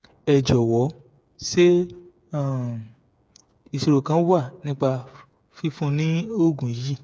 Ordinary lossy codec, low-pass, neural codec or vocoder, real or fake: none; none; codec, 16 kHz, 8 kbps, FreqCodec, smaller model; fake